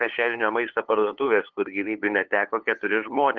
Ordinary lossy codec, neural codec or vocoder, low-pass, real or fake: Opus, 16 kbps; codec, 16 kHz, 8 kbps, FunCodec, trained on LibriTTS, 25 frames a second; 7.2 kHz; fake